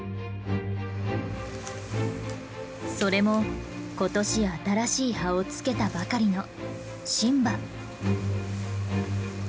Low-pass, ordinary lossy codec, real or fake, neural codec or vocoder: none; none; real; none